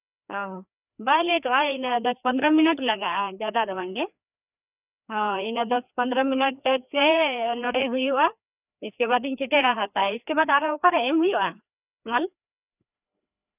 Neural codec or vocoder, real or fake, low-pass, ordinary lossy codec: codec, 16 kHz, 2 kbps, FreqCodec, larger model; fake; 3.6 kHz; none